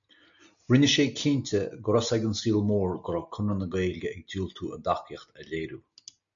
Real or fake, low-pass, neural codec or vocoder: real; 7.2 kHz; none